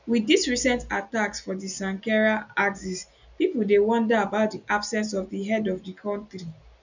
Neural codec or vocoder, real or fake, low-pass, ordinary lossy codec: none; real; 7.2 kHz; none